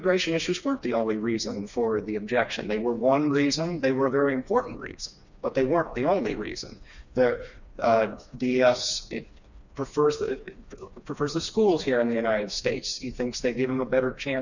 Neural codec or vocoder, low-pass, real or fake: codec, 16 kHz, 2 kbps, FreqCodec, smaller model; 7.2 kHz; fake